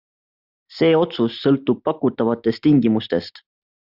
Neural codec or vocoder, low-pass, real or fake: none; 5.4 kHz; real